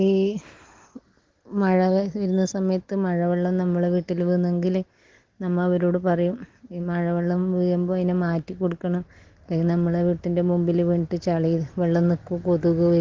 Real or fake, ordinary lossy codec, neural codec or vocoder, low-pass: real; Opus, 16 kbps; none; 7.2 kHz